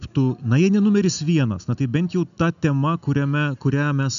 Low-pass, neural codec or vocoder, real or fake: 7.2 kHz; none; real